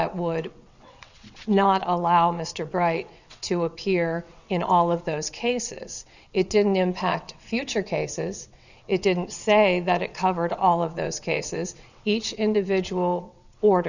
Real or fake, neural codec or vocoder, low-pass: fake; vocoder, 22.05 kHz, 80 mel bands, WaveNeXt; 7.2 kHz